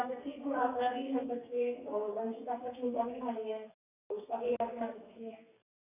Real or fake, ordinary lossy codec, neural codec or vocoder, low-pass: fake; none; codec, 32 kHz, 1.9 kbps, SNAC; 3.6 kHz